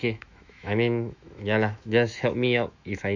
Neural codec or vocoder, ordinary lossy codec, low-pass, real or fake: autoencoder, 48 kHz, 128 numbers a frame, DAC-VAE, trained on Japanese speech; none; 7.2 kHz; fake